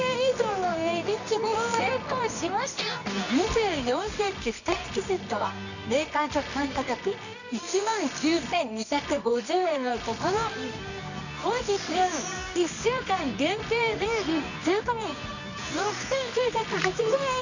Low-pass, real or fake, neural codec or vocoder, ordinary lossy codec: 7.2 kHz; fake; codec, 24 kHz, 0.9 kbps, WavTokenizer, medium music audio release; none